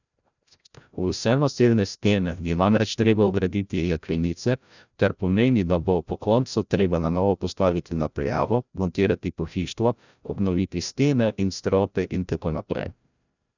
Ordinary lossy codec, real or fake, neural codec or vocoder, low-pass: none; fake; codec, 16 kHz, 0.5 kbps, FreqCodec, larger model; 7.2 kHz